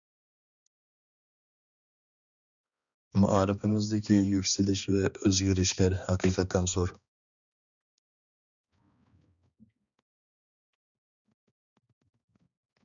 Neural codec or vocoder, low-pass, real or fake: codec, 16 kHz, 2 kbps, X-Codec, HuBERT features, trained on balanced general audio; 7.2 kHz; fake